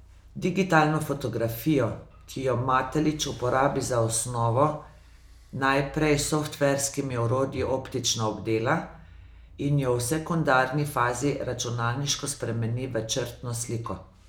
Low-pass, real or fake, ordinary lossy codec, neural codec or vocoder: none; real; none; none